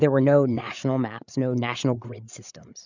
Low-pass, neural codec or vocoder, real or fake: 7.2 kHz; codec, 16 kHz, 8 kbps, FreqCodec, larger model; fake